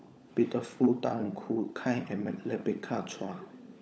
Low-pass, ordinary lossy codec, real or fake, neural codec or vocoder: none; none; fake; codec, 16 kHz, 16 kbps, FunCodec, trained on LibriTTS, 50 frames a second